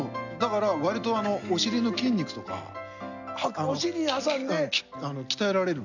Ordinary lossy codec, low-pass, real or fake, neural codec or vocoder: none; 7.2 kHz; real; none